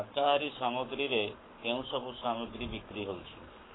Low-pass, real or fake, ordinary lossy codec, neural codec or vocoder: 7.2 kHz; fake; AAC, 16 kbps; codec, 44.1 kHz, 7.8 kbps, Pupu-Codec